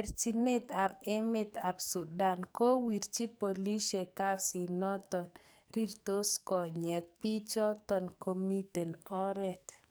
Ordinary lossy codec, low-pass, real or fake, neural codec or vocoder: none; none; fake; codec, 44.1 kHz, 2.6 kbps, SNAC